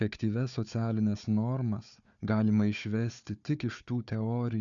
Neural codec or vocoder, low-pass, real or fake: codec, 16 kHz, 4 kbps, FunCodec, trained on Chinese and English, 50 frames a second; 7.2 kHz; fake